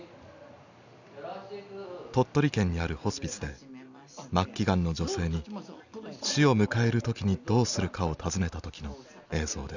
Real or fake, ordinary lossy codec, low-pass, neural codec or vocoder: real; none; 7.2 kHz; none